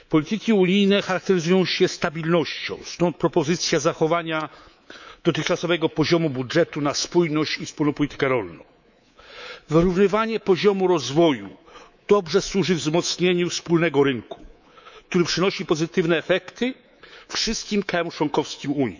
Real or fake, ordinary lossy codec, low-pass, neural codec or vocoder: fake; none; 7.2 kHz; codec, 24 kHz, 3.1 kbps, DualCodec